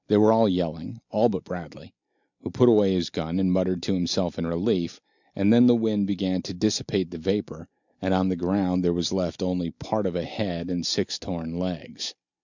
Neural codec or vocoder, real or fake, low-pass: none; real; 7.2 kHz